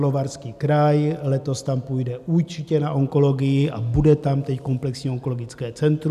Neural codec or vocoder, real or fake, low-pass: none; real; 14.4 kHz